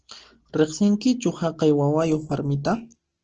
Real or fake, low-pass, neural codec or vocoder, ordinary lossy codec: real; 7.2 kHz; none; Opus, 16 kbps